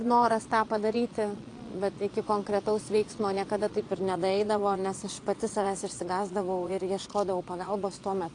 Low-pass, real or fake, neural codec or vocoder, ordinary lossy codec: 9.9 kHz; fake; vocoder, 22.05 kHz, 80 mel bands, Vocos; AAC, 48 kbps